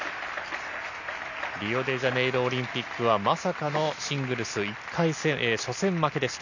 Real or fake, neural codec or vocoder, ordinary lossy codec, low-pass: real; none; none; 7.2 kHz